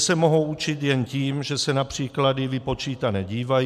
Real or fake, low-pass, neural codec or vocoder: real; 14.4 kHz; none